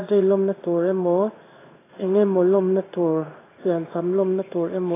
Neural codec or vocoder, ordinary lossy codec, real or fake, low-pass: codec, 16 kHz in and 24 kHz out, 1 kbps, XY-Tokenizer; AAC, 16 kbps; fake; 3.6 kHz